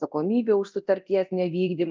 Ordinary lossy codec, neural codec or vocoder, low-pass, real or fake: Opus, 24 kbps; codec, 24 kHz, 0.9 kbps, DualCodec; 7.2 kHz; fake